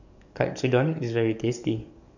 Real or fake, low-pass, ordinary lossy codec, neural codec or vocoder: fake; 7.2 kHz; none; codec, 44.1 kHz, 7.8 kbps, DAC